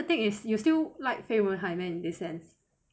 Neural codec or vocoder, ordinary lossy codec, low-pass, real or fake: none; none; none; real